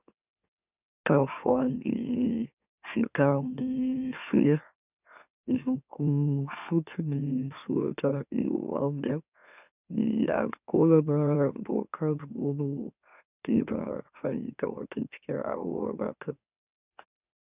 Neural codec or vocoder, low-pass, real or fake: autoencoder, 44.1 kHz, a latent of 192 numbers a frame, MeloTTS; 3.6 kHz; fake